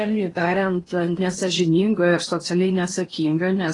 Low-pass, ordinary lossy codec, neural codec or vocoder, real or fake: 10.8 kHz; AAC, 32 kbps; codec, 16 kHz in and 24 kHz out, 0.8 kbps, FocalCodec, streaming, 65536 codes; fake